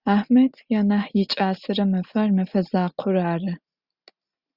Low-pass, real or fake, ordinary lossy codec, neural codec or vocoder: 5.4 kHz; real; Opus, 64 kbps; none